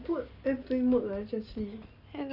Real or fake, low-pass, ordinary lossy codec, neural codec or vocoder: real; 5.4 kHz; none; none